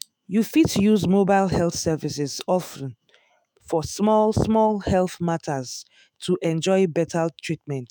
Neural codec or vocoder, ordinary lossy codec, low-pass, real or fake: autoencoder, 48 kHz, 128 numbers a frame, DAC-VAE, trained on Japanese speech; none; none; fake